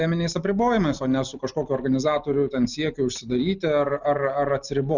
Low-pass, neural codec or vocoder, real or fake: 7.2 kHz; none; real